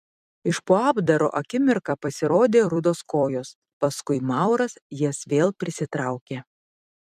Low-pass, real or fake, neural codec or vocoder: 14.4 kHz; fake; vocoder, 44.1 kHz, 128 mel bands every 256 samples, BigVGAN v2